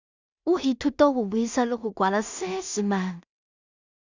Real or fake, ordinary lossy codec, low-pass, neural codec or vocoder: fake; Opus, 64 kbps; 7.2 kHz; codec, 16 kHz in and 24 kHz out, 0.4 kbps, LongCat-Audio-Codec, two codebook decoder